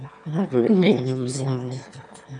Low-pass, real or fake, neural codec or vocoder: 9.9 kHz; fake; autoencoder, 22.05 kHz, a latent of 192 numbers a frame, VITS, trained on one speaker